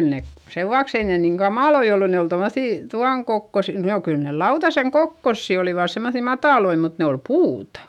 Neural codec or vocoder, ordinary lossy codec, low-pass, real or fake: autoencoder, 48 kHz, 128 numbers a frame, DAC-VAE, trained on Japanese speech; none; 19.8 kHz; fake